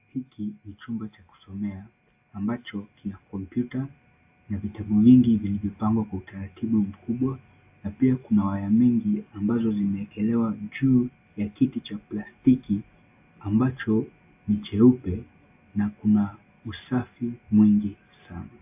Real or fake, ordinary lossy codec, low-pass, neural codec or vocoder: real; AAC, 32 kbps; 3.6 kHz; none